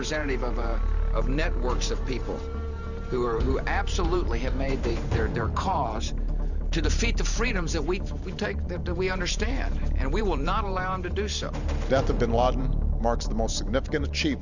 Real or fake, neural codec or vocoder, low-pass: fake; vocoder, 44.1 kHz, 128 mel bands every 512 samples, BigVGAN v2; 7.2 kHz